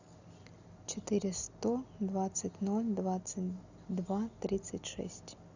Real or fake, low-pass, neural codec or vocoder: real; 7.2 kHz; none